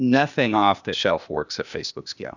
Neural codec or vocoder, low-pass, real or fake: codec, 16 kHz, 0.8 kbps, ZipCodec; 7.2 kHz; fake